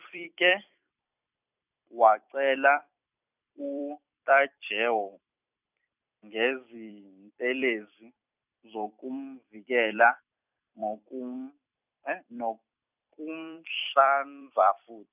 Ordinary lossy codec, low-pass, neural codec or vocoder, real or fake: none; 3.6 kHz; vocoder, 44.1 kHz, 128 mel bands every 256 samples, BigVGAN v2; fake